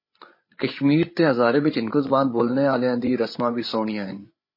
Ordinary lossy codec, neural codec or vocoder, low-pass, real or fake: MP3, 24 kbps; codec, 16 kHz, 8 kbps, FreqCodec, larger model; 5.4 kHz; fake